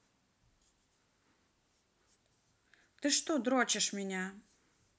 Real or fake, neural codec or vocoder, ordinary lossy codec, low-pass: real; none; none; none